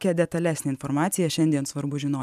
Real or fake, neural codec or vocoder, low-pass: fake; vocoder, 44.1 kHz, 128 mel bands every 512 samples, BigVGAN v2; 14.4 kHz